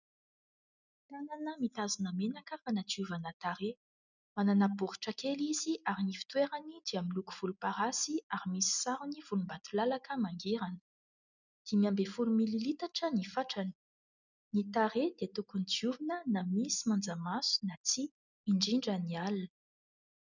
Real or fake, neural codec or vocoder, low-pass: real; none; 7.2 kHz